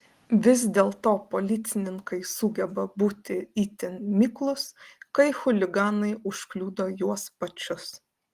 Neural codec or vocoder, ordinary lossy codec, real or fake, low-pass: none; Opus, 24 kbps; real; 14.4 kHz